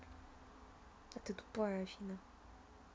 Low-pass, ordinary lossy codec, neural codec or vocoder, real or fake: none; none; none; real